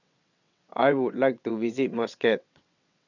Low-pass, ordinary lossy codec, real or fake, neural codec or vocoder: 7.2 kHz; none; fake; vocoder, 22.05 kHz, 80 mel bands, WaveNeXt